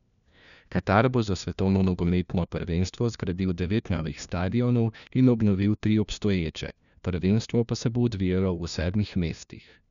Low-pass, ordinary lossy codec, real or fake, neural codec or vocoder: 7.2 kHz; none; fake; codec, 16 kHz, 1 kbps, FunCodec, trained on LibriTTS, 50 frames a second